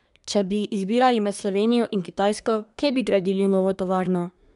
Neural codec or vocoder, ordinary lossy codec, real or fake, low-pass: codec, 24 kHz, 1 kbps, SNAC; none; fake; 10.8 kHz